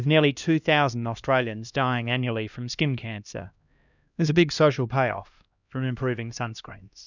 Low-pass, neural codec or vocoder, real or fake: 7.2 kHz; codec, 16 kHz, 1 kbps, X-Codec, HuBERT features, trained on LibriSpeech; fake